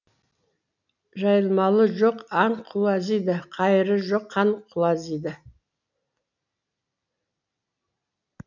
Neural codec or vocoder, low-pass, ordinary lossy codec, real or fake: none; 7.2 kHz; none; real